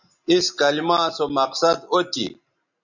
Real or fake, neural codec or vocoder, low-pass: real; none; 7.2 kHz